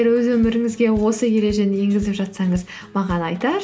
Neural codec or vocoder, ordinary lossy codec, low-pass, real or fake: none; none; none; real